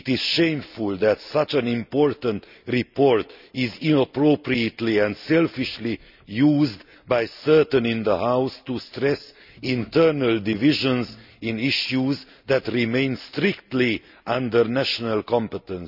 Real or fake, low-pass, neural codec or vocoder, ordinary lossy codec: real; 5.4 kHz; none; none